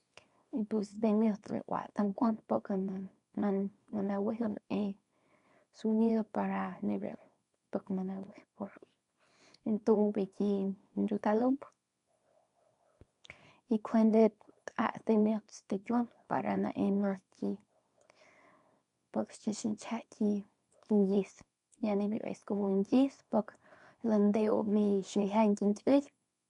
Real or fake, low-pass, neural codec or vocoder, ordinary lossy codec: fake; 10.8 kHz; codec, 24 kHz, 0.9 kbps, WavTokenizer, small release; none